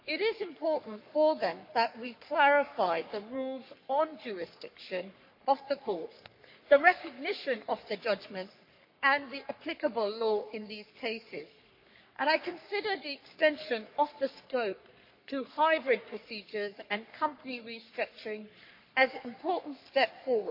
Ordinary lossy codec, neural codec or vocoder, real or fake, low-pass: MP3, 32 kbps; codec, 44.1 kHz, 3.4 kbps, Pupu-Codec; fake; 5.4 kHz